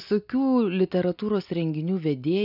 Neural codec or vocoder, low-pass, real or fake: none; 5.4 kHz; real